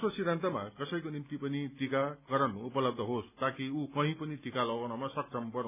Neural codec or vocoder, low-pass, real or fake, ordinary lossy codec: none; 3.6 kHz; real; none